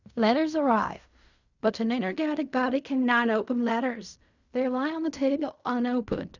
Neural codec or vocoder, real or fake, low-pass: codec, 16 kHz in and 24 kHz out, 0.4 kbps, LongCat-Audio-Codec, fine tuned four codebook decoder; fake; 7.2 kHz